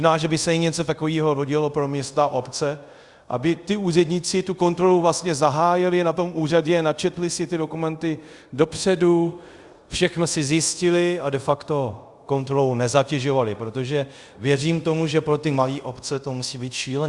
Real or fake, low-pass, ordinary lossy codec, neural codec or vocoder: fake; 10.8 kHz; Opus, 64 kbps; codec, 24 kHz, 0.5 kbps, DualCodec